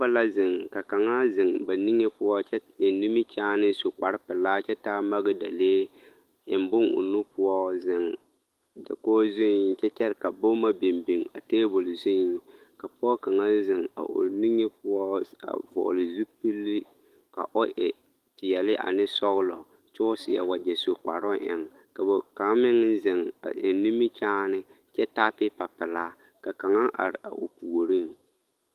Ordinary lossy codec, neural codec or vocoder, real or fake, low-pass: Opus, 24 kbps; autoencoder, 48 kHz, 128 numbers a frame, DAC-VAE, trained on Japanese speech; fake; 14.4 kHz